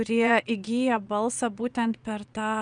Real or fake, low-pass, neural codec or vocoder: fake; 9.9 kHz; vocoder, 22.05 kHz, 80 mel bands, Vocos